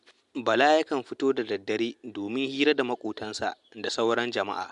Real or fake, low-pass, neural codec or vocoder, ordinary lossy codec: real; 10.8 kHz; none; MP3, 64 kbps